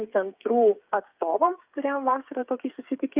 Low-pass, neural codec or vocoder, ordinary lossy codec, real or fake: 3.6 kHz; codec, 16 kHz, 8 kbps, FreqCodec, smaller model; Opus, 24 kbps; fake